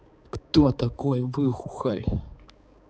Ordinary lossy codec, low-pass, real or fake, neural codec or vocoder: none; none; fake; codec, 16 kHz, 4 kbps, X-Codec, HuBERT features, trained on balanced general audio